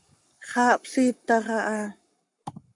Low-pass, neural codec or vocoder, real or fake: 10.8 kHz; codec, 44.1 kHz, 7.8 kbps, Pupu-Codec; fake